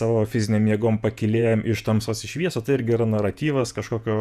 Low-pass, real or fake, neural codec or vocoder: 14.4 kHz; fake; vocoder, 48 kHz, 128 mel bands, Vocos